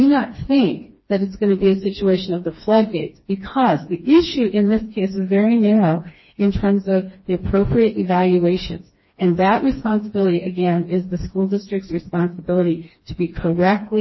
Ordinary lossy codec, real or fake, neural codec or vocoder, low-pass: MP3, 24 kbps; fake; codec, 16 kHz, 2 kbps, FreqCodec, smaller model; 7.2 kHz